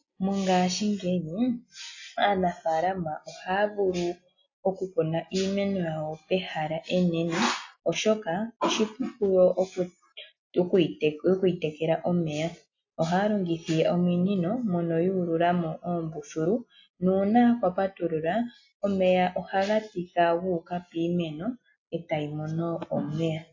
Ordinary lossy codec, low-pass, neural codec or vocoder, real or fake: AAC, 48 kbps; 7.2 kHz; none; real